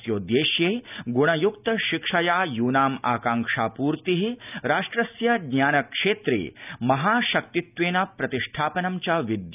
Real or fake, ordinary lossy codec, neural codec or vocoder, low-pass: real; none; none; 3.6 kHz